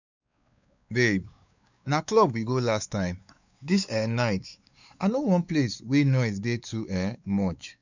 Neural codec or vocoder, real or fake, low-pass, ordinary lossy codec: codec, 16 kHz, 4 kbps, X-Codec, WavLM features, trained on Multilingual LibriSpeech; fake; 7.2 kHz; none